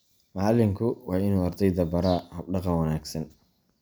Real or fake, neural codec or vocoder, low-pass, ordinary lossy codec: real; none; none; none